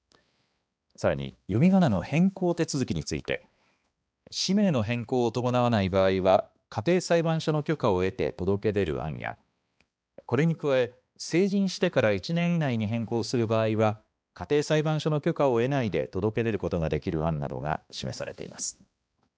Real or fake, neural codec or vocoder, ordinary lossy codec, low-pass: fake; codec, 16 kHz, 2 kbps, X-Codec, HuBERT features, trained on balanced general audio; none; none